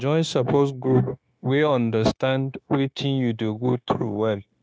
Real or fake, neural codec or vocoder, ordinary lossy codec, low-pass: fake; codec, 16 kHz, 0.9 kbps, LongCat-Audio-Codec; none; none